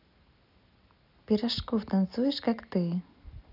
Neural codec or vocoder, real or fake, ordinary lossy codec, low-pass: none; real; none; 5.4 kHz